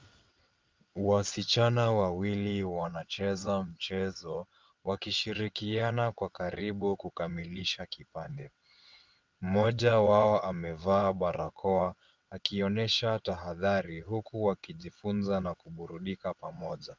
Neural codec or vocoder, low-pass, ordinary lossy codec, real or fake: vocoder, 22.05 kHz, 80 mel bands, WaveNeXt; 7.2 kHz; Opus, 24 kbps; fake